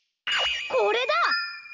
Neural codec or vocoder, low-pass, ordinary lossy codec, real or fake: none; 7.2 kHz; none; real